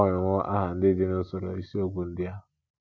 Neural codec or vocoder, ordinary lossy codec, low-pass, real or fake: none; none; none; real